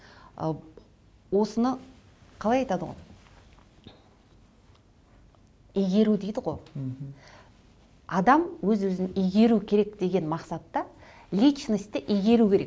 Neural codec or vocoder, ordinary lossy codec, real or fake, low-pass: none; none; real; none